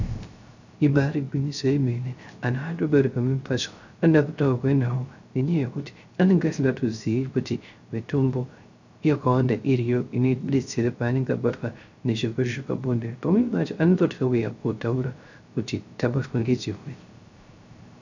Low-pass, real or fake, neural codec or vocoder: 7.2 kHz; fake; codec, 16 kHz, 0.3 kbps, FocalCodec